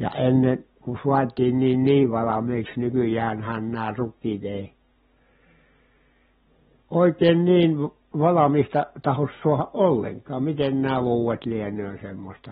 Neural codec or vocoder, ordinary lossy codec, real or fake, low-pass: none; AAC, 16 kbps; real; 19.8 kHz